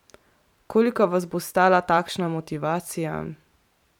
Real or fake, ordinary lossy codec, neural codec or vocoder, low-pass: fake; none; vocoder, 44.1 kHz, 128 mel bands every 512 samples, BigVGAN v2; 19.8 kHz